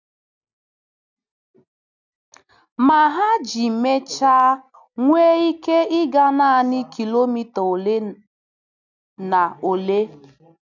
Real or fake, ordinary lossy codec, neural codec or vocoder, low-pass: real; none; none; 7.2 kHz